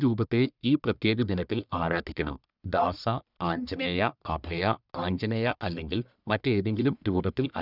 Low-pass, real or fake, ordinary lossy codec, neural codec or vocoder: 5.4 kHz; fake; none; codec, 44.1 kHz, 1.7 kbps, Pupu-Codec